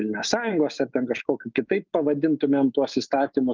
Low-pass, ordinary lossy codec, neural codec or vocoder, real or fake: 7.2 kHz; Opus, 32 kbps; autoencoder, 48 kHz, 128 numbers a frame, DAC-VAE, trained on Japanese speech; fake